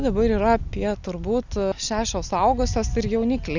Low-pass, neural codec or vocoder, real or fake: 7.2 kHz; none; real